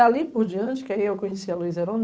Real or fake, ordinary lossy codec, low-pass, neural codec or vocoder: fake; none; none; codec, 16 kHz, 8 kbps, FunCodec, trained on Chinese and English, 25 frames a second